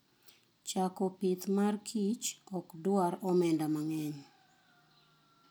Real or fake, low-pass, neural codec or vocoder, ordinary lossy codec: real; none; none; none